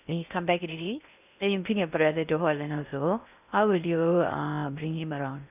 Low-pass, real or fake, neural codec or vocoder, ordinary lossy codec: 3.6 kHz; fake; codec, 16 kHz in and 24 kHz out, 0.6 kbps, FocalCodec, streaming, 2048 codes; none